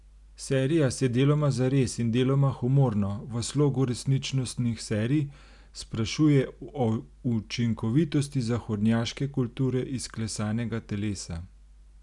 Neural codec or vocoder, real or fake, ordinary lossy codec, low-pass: none; real; none; 10.8 kHz